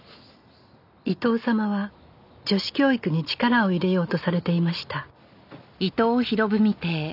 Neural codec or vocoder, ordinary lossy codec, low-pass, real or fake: none; none; 5.4 kHz; real